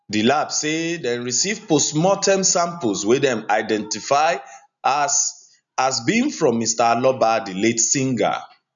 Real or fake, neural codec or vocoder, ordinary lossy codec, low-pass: real; none; none; 7.2 kHz